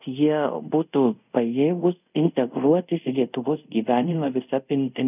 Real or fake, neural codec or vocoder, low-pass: fake; codec, 24 kHz, 0.5 kbps, DualCodec; 3.6 kHz